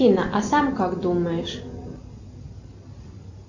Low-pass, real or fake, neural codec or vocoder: 7.2 kHz; real; none